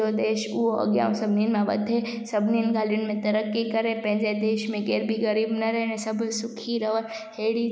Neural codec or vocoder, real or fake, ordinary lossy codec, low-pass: none; real; none; none